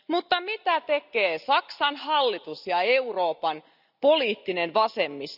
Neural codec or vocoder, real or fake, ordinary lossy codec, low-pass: none; real; none; 5.4 kHz